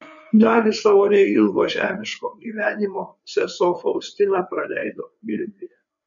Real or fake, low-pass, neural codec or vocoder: fake; 7.2 kHz; codec, 16 kHz, 4 kbps, FreqCodec, larger model